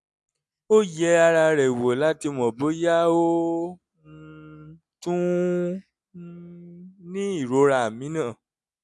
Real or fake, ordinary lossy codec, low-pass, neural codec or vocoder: real; none; none; none